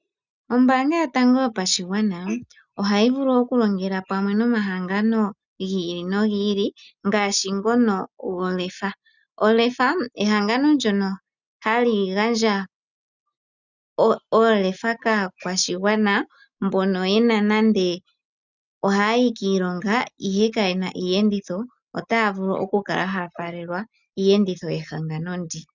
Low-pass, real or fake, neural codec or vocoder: 7.2 kHz; real; none